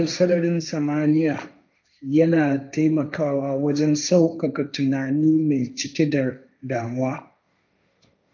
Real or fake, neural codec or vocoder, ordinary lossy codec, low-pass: fake; codec, 16 kHz, 1.1 kbps, Voila-Tokenizer; none; 7.2 kHz